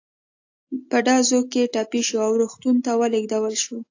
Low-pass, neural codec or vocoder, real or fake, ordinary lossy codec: 7.2 kHz; none; real; AAC, 48 kbps